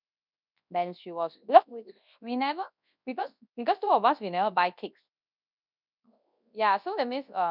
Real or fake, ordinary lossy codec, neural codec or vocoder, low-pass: fake; none; codec, 24 kHz, 0.9 kbps, WavTokenizer, large speech release; 5.4 kHz